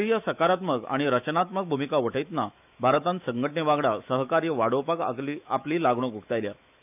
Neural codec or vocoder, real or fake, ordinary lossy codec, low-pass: autoencoder, 48 kHz, 128 numbers a frame, DAC-VAE, trained on Japanese speech; fake; none; 3.6 kHz